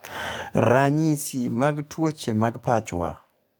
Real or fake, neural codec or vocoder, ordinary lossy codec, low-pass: fake; codec, 44.1 kHz, 2.6 kbps, SNAC; none; none